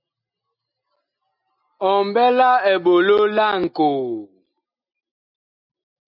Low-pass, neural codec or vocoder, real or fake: 5.4 kHz; none; real